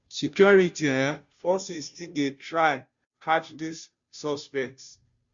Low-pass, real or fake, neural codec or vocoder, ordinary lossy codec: 7.2 kHz; fake; codec, 16 kHz, 0.5 kbps, FunCodec, trained on Chinese and English, 25 frames a second; Opus, 64 kbps